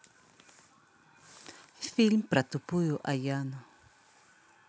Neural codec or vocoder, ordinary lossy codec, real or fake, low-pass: none; none; real; none